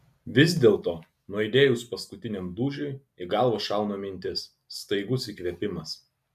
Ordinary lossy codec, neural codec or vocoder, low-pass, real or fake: MP3, 96 kbps; none; 14.4 kHz; real